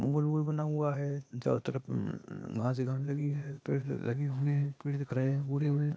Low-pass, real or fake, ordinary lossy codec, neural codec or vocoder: none; fake; none; codec, 16 kHz, 0.8 kbps, ZipCodec